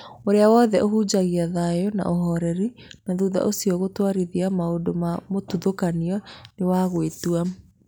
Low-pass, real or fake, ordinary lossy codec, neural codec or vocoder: none; real; none; none